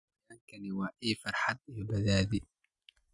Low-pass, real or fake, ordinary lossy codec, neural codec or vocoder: 10.8 kHz; real; none; none